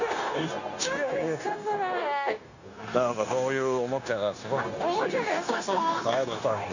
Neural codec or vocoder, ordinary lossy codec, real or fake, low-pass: codec, 16 kHz, 0.9 kbps, LongCat-Audio-Codec; none; fake; 7.2 kHz